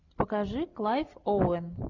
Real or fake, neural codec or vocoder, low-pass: real; none; 7.2 kHz